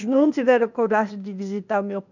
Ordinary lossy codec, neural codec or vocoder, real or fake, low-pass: none; codec, 16 kHz, 0.8 kbps, ZipCodec; fake; 7.2 kHz